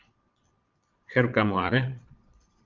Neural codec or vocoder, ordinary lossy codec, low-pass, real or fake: vocoder, 22.05 kHz, 80 mel bands, Vocos; Opus, 24 kbps; 7.2 kHz; fake